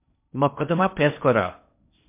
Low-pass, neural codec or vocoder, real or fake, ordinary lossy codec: 3.6 kHz; codec, 16 kHz in and 24 kHz out, 0.6 kbps, FocalCodec, streaming, 4096 codes; fake; MP3, 32 kbps